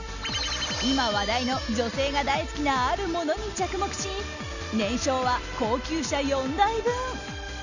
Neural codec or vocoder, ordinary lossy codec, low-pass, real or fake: none; none; 7.2 kHz; real